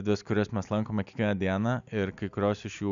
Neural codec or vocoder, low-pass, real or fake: none; 7.2 kHz; real